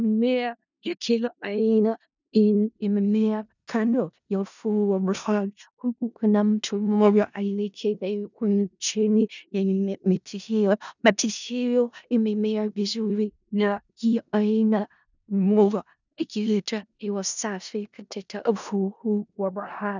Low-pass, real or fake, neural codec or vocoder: 7.2 kHz; fake; codec, 16 kHz in and 24 kHz out, 0.4 kbps, LongCat-Audio-Codec, four codebook decoder